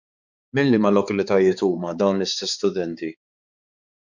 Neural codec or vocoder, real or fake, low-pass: codec, 16 kHz, 4 kbps, X-Codec, HuBERT features, trained on balanced general audio; fake; 7.2 kHz